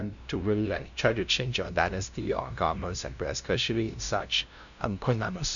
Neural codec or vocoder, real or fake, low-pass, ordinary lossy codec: codec, 16 kHz, 0.5 kbps, FunCodec, trained on LibriTTS, 25 frames a second; fake; 7.2 kHz; none